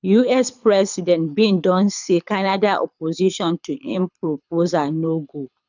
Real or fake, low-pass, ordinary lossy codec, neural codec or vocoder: fake; 7.2 kHz; none; codec, 24 kHz, 6 kbps, HILCodec